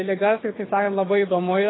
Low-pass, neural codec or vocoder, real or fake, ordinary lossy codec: 7.2 kHz; codec, 24 kHz, 6 kbps, HILCodec; fake; AAC, 16 kbps